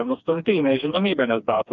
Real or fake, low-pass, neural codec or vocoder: fake; 7.2 kHz; codec, 16 kHz, 2 kbps, FreqCodec, smaller model